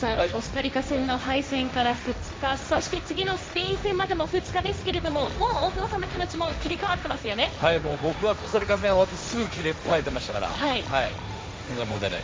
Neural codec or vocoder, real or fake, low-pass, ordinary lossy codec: codec, 16 kHz, 1.1 kbps, Voila-Tokenizer; fake; none; none